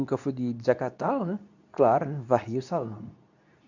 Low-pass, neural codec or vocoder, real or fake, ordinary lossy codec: 7.2 kHz; codec, 24 kHz, 0.9 kbps, WavTokenizer, medium speech release version 2; fake; none